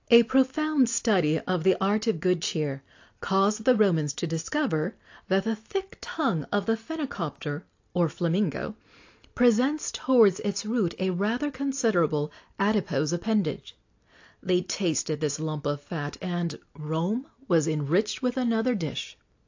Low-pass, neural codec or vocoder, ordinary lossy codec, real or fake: 7.2 kHz; none; AAC, 48 kbps; real